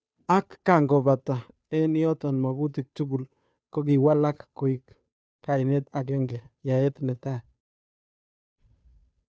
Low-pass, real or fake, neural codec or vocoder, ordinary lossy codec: none; fake; codec, 16 kHz, 2 kbps, FunCodec, trained on Chinese and English, 25 frames a second; none